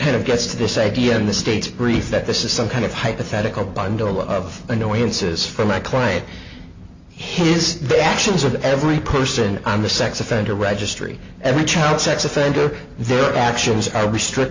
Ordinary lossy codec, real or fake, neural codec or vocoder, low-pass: MP3, 64 kbps; real; none; 7.2 kHz